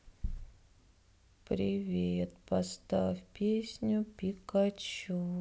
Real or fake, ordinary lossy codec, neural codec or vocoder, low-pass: real; none; none; none